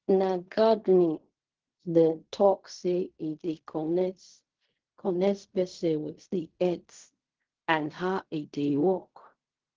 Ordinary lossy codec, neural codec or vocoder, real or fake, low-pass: Opus, 16 kbps; codec, 16 kHz in and 24 kHz out, 0.4 kbps, LongCat-Audio-Codec, fine tuned four codebook decoder; fake; 7.2 kHz